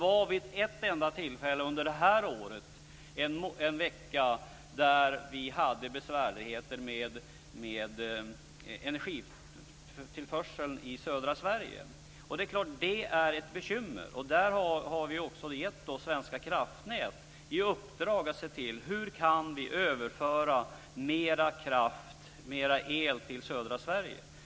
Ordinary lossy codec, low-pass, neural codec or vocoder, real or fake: none; none; none; real